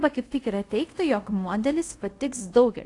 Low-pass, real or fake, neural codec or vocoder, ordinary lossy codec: 10.8 kHz; fake; codec, 24 kHz, 0.5 kbps, DualCodec; AAC, 48 kbps